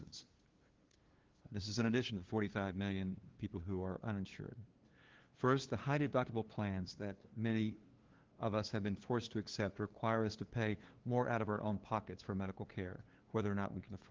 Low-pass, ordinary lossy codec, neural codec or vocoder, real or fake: 7.2 kHz; Opus, 16 kbps; codec, 16 kHz, 2 kbps, FunCodec, trained on LibriTTS, 25 frames a second; fake